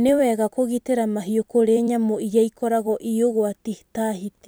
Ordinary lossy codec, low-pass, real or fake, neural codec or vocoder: none; none; fake; vocoder, 44.1 kHz, 128 mel bands every 256 samples, BigVGAN v2